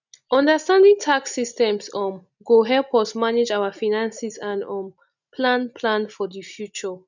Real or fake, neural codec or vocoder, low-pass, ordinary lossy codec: real; none; 7.2 kHz; none